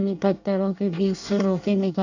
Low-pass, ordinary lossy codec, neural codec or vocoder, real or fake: 7.2 kHz; none; codec, 24 kHz, 1 kbps, SNAC; fake